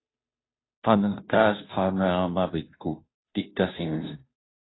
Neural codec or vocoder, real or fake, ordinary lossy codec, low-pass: codec, 16 kHz, 0.5 kbps, FunCodec, trained on Chinese and English, 25 frames a second; fake; AAC, 16 kbps; 7.2 kHz